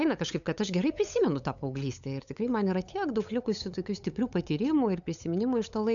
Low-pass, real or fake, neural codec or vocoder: 7.2 kHz; fake; codec, 16 kHz, 8 kbps, FunCodec, trained on LibriTTS, 25 frames a second